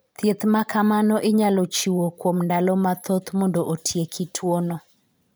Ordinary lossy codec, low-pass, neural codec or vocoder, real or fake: none; none; vocoder, 44.1 kHz, 128 mel bands every 512 samples, BigVGAN v2; fake